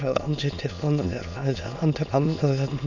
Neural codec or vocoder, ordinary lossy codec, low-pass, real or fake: autoencoder, 22.05 kHz, a latent of 192 numbers a frame, VITS, trained on many speakers; none; 7.2 kHz; fake